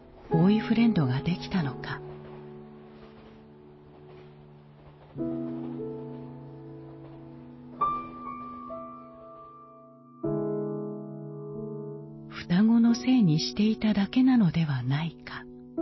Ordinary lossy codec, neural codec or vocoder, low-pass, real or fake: MP3, 24 kbps; none; 7.2 kHz; real